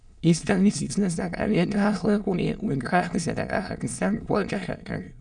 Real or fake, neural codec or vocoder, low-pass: fake; autoencoder, 22.05 kHz, a latent of 192 numbers a frame, VITS, trained on many speakers; 9.9 kHz